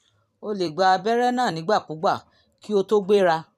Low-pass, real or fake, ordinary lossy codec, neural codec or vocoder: 14.4 kHz; fake; none; vocoder, 44.1 kHz, 128 mel bands every 256 samples, BigVGAN v2